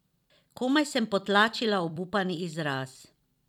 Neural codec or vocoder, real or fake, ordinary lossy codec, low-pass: vocoder, 44.1 kHz, 128 mel bands every 256 samples, BigVGAN v2; fake; none; 19.8 kHz